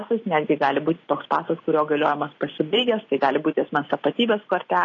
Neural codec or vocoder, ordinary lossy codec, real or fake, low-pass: none; AAC, 32 kbps; real; 7.2 kHz